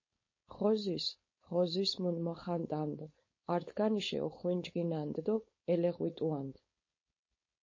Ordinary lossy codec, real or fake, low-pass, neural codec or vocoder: MP3, 32 kbps; fake; 7.2 kHz; codec, 16 kHz, 4.8 kbps, FACodec